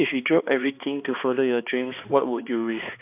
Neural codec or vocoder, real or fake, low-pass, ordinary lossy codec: codec, 16 kHz, 2 kbps, X-Codec, HuBERT features, trained on balanced general audio; fake; 3.6 kHz; none